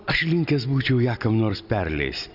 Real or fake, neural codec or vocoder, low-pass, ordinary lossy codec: real; none; 5.4 kHz; Opus, 64 kbps